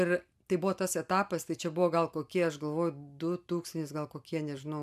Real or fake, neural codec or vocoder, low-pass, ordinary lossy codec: real; none; 14.4 kHz; MP3, 96 kbps